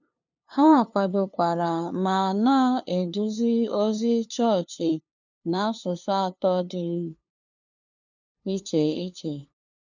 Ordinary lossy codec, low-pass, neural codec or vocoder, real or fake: none; 7.2 kHz; codec, 16 kHz, 2 kbps, FunCodec, trained on LibriTTS, 25 frames a second; fake